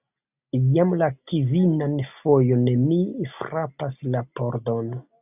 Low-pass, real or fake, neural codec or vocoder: 3.6 kHz; real; none